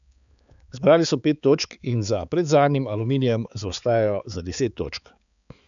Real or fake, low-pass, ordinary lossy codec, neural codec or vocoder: fake; 7.2 kHz; none; codec, 16 kHz, 4 kbps, X-Codec, HuBERT features, trained on balanced general audio